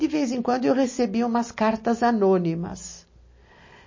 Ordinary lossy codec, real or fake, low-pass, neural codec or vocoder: MP3, 32 kbps; real; 7.2 kHz; none